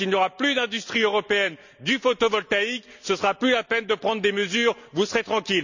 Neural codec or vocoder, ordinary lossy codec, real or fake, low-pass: none; none; real; 7.2 kHz